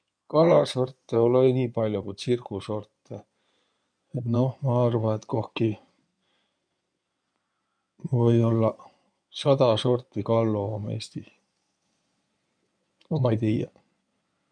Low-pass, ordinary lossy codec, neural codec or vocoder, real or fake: 9.9 kHz; none; codec, 16 kHz in and 24 kHz out, 2.2 kbps, FireRedTTS-2 codec; fake